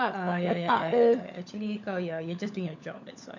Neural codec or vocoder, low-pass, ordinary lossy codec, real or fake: codec, 16 kHz, 16 kbps, FunCodec, trained on LibriTTS, 50 frames a second; 7.2 kHz; none; fake